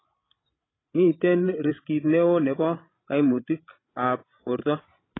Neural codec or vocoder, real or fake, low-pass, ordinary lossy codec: codec, 16 kHz, 16 kbps, FreqCodec, larger model; fake; 7.2 kHz; AAC, 16 kbps